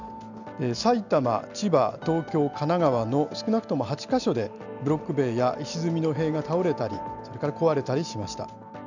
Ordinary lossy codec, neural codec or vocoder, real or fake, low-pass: none; none; real; 7.2 kHz